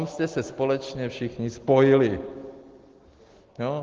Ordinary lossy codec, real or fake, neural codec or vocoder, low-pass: Opus, 16 kbps; real; none; 7.2 kHz